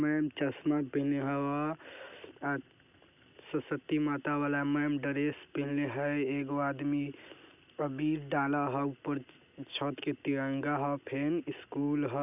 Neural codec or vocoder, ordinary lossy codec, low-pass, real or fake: none; none; 3.6 kHz; real